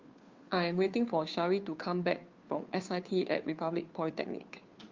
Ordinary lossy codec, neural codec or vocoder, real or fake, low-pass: Opus, 32 kbps; codec, 16 kHz, 2 kbps, FunCodec, trained on Chinese and English, 25 frames a second; fake; 7.2 kHz